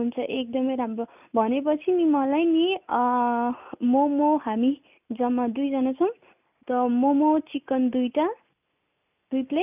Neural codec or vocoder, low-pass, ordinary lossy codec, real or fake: none; 3.6 kHz; none; real